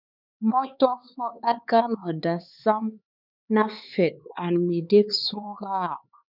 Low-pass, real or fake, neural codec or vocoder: 5.4 kHz; fake; codec, 16 kHz, 4 kbps, X-Codec, HuBERT features, trained on LibriSpeech